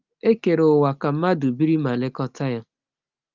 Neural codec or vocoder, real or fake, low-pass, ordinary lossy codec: none; real; 7.2 kHz; Opus, 24 kbps